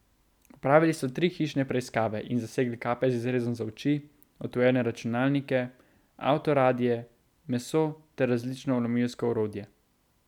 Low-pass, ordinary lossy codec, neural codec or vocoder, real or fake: 19.8 kHz; none; none; real